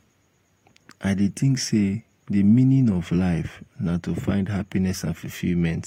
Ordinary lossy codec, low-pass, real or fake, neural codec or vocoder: AAC, 48 kbps; 19.8 kHz; real; none